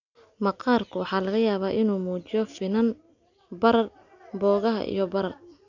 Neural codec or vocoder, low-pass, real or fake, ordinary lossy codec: none; 7.2 kHz; real; none